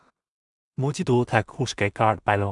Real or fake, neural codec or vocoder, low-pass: fake; codec, 16 kHz in and 24 kHz out, 0.4 kbps, LongCat-Audio-Codec, two codebook decoder; 10.8 kHz